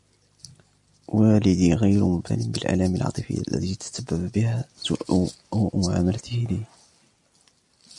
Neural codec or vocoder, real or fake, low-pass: none; real; 10.8 kHz